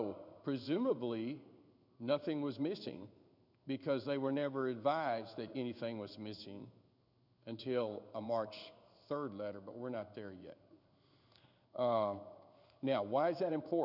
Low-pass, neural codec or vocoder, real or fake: 5.4 kHz; codec, 16 kHz in and 24 kHz out, 1 kbps, XY-Tokenizer; fake